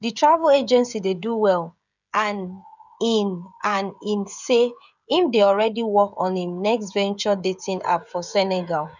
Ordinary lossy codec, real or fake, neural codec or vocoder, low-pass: none; fake; codec, 16 kHz, 16 kbps, FreqCodec, smaller model; 7.2 kHz